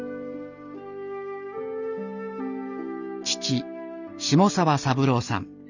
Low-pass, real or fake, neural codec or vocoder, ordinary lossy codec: 7.2 kHz; real; none; none